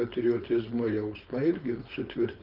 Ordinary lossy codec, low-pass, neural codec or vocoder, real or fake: Opus, 32 kbps; 5.4 kHz; codec, 16 kHz, 4.8 kbps, FACodec; fake